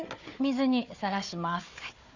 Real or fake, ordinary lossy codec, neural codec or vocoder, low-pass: fake; Opus, 64 kbps; codec, 16 kHz, 4 kbps, FunCodec, trained on Chinese and English, 50 frames a second; 7.2 kHz